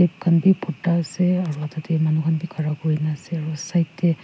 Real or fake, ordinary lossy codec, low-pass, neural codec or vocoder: real; none; none; none